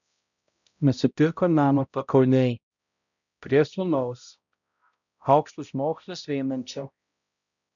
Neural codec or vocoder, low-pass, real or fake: codec, 16 kHz, 0.5 kbps, X-Codec, HuBERT features, trained on balanced general audio; 7.2 kHz; fake